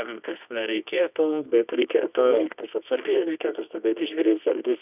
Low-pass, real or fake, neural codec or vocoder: 3.6 kHz; fake; codec, 24 kHz, 0.9 kbps, WavTokenizer, medium music audio release